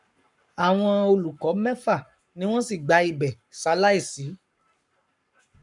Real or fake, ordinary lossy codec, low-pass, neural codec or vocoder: fake; none; 10.8 kHz; codec, 44.1 kHz, 7.8 kbps, Pupu-Codec